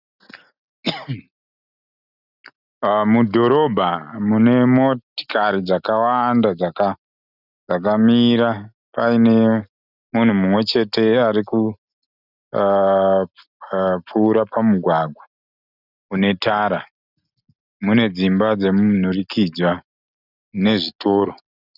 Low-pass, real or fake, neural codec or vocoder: 5.4 kHz; real; none